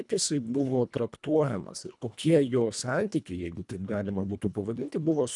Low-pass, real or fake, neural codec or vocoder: 10.8 kHz; fake; codec, 24 kHz, 1.5 kbps, HILCodec